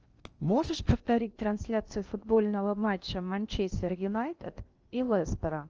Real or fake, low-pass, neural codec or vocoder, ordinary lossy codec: fake; 7.2 kHz; codec, 16 kHz in and 24 kHz out, 0.6 kbps, FocalCodec, streaming, 2048 codes; Opus, 24 kbps